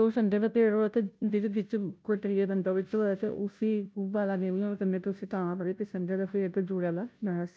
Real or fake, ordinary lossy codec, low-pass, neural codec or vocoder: fake; none; none; codec, 16 kHz, 0.5 kbps, FunCodec, trained on Chinese and English, 25 frames a second